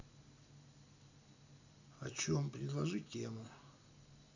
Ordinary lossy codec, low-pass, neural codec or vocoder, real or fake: AAC, 48 kbps; 7.2 kHz; none; real